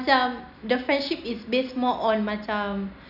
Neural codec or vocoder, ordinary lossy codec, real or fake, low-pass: none; none; real; 5.4 kHz